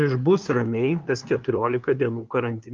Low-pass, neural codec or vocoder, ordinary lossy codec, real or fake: 7.2 kHz; codec, 16 kHz, 2 kbps, FunCodec, trained on LibriTTS, 25 frames a second; Opus, 24 kbps; fake